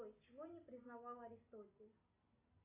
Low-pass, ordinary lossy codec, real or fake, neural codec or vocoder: 3.6 kHz; AAC, 24 kbps; real; none